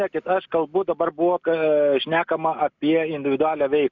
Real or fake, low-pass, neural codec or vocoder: real; 7.2 kHz; none